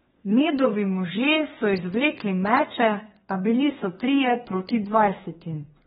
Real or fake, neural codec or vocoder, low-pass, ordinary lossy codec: fake; codec, 32 kHz, 1.9 kbps, SNAC; 14.4 kHz; AAC, 16 kbps